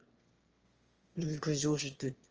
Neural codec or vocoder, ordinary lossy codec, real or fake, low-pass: autoencoder, 22.05 kHz, a latent of 192 numbers a frame, VITS, trained on one speaker; Opus, 24 kbps; fake; 7.2 kHz